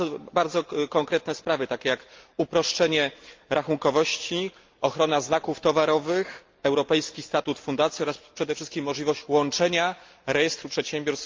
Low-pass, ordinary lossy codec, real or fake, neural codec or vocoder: 7.2 kHz; Opus, 24 kbps; real; none